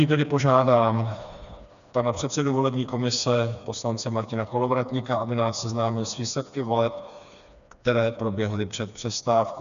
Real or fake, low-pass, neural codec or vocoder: fake; 7.2 kHz; codec, 16 kHz, 2 kbps, FreqCodec, smaller model